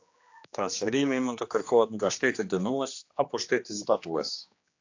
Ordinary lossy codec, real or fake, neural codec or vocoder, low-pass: AAC, 48 kbps; fake; codec, 16 kHz, 2 kbps, X-Codec, HuBERT features, trained on general audio; 7.2 kHz